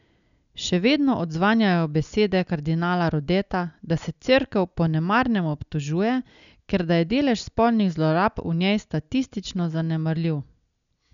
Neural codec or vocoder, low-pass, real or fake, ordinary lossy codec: none; 7.2 kHz; real; none